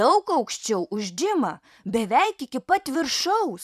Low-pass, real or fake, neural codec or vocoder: 14.4 kHz; fake; vocoder, 44.1 kHz, 128 mel bands every 512 samples, BigVGAN v2